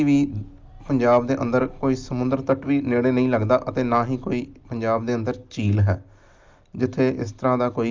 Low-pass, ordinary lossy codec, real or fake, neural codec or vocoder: 7.2 kHz; Opus, 32 kbps; real; none